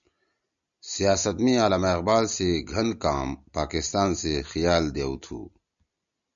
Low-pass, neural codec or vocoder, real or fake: 7.2 kHz; none; real